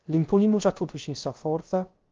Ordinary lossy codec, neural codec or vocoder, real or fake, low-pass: Opus, 24 kbps; codec, 16 kHz, 0.3 kbps, FocalCodec; fake; 7.2 kHz